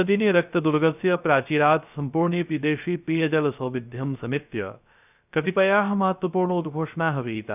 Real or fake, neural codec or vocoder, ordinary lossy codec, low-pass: fake; codec, 16 kHz, 0.3 kbps, FocalCodec; none; 3.6 kHz